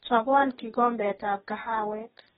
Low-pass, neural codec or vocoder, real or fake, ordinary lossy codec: 19.8 kHz; codec, 44.1 kHz, 2.6 kbps, DAC; fake; AAC, 16 kbps